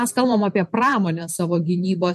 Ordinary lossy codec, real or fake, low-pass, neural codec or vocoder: MP3, 64 kbps; fake; 14.4 kHz; vocoder, 44.1 kHz, 128 mel bands every 512 samples, BigVGAN v2